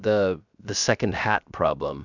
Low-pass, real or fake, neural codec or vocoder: 7.2 kHz; fake; codec, 16 kHz, 0.7 kbps, FocalCodec